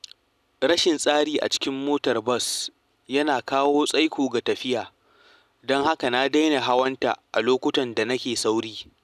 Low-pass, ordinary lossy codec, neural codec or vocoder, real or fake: 14.4 kHz; none; none; real